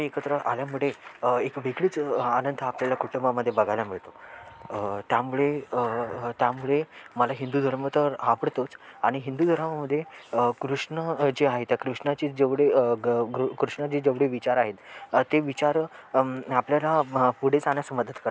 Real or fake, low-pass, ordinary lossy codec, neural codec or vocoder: real; none; none; none